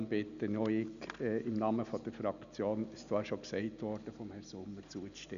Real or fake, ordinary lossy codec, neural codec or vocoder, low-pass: real; none; none; 7.2 kHz